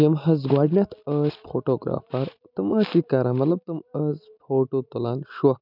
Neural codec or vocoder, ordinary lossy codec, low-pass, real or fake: none; none; 5.4 kHz; real